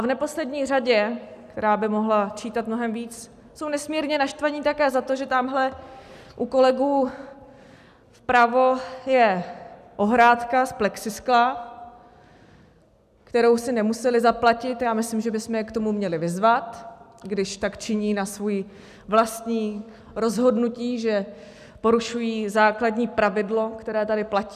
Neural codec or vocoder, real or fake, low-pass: none; real; 14.4 kHz